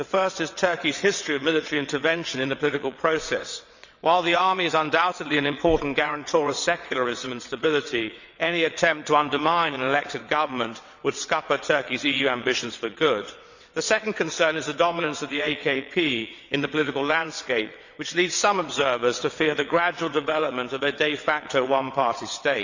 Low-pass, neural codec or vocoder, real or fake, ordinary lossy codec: 7.2 kHz; vocoder, 22.05 kHz, 80 mel bands, WaveNeXt; fake; none